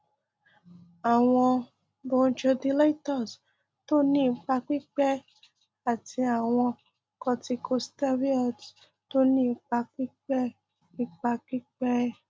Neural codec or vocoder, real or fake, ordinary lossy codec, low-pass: none; real; none; none